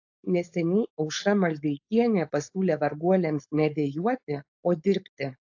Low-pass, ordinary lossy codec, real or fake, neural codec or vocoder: 7.2 kHz; AAC, 48 kbps; fake; codec, 16 kHz, 4.8 kbps, FACodec